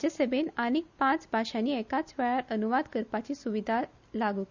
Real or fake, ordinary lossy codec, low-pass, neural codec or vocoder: real; none; 7.2 kHz; none